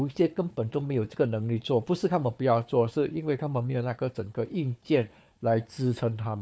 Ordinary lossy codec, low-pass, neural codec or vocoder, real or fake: none; none; codec, 16 kHz, 4 kbps, FunCodec, trained on Chinese and English, 50 frames a second; fake